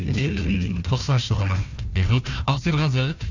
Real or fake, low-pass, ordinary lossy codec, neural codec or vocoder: fake; 7.2 kHz; none; codec, 16 kHz, 1 kbps, FunCodec, trained on Chinese and English, 50 frames a second